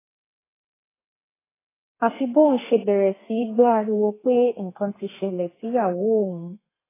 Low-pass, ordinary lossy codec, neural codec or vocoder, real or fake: 3.6 kHz; AAC, 16 kbps; codec, 32 kHz, 1.9 kbps, SNAC; fake